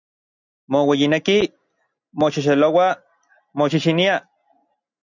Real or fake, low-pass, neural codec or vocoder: real; 7.2 kHz; none